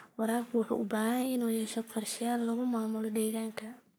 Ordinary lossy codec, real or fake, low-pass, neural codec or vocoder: none; fake; none; codec, 44.1 kHz, 3.4 kbps, Pupu-Codec